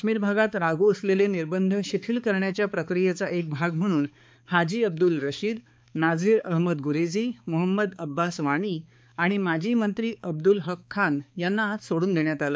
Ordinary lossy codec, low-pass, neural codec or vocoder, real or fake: none; none; codec, 16 kHz, 4 kbps, X-Codec, HuBERT features, trained on balanced general audio; fake